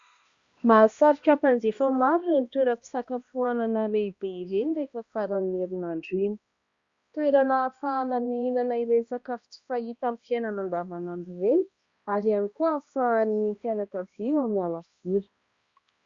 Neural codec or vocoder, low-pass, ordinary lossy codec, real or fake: codec, 16 kHz, 1 kbps, X-Codec, HuBERT features, trained on balanced general audio; 7.2 kHz; Opus, 64 kbps; fake